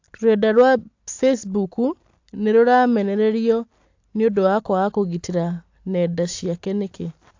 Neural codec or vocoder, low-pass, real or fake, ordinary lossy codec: none; 7.2 kHz; real; AAC, 48 kbps